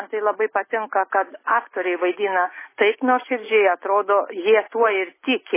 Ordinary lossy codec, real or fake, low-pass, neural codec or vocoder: MP3, 16 kbps; real; 3.6 kHz; none